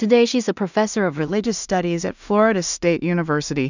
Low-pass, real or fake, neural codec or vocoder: 7.2 kHz; fake; codec, 16 kHz in and 24 kHz out, 0.4 kbps, LongCat-Audio-Codec, two codebook decoder